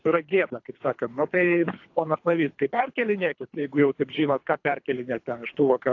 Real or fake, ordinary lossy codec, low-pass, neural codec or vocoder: fake; AAC, 48 kbps; 7.2 kHz; codec, 24 kHz, 3 kbps, HILCodec